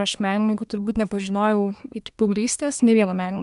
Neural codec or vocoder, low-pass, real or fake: codec, 24 kHz, 1 kbps, SNAC; 10.8 kHz; fake